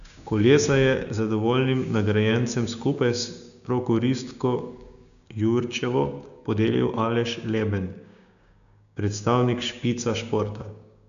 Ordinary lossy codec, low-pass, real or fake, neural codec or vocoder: none; 7.2 kHz; fake; codec, 16 kHz, 6 kbps, DAC